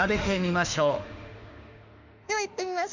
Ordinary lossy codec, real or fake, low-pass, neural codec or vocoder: none; fake; 7.2 kHz; autoencoder, 48 kHz, 32 numbers a frame, DAC-VAE, trained on Japanese speech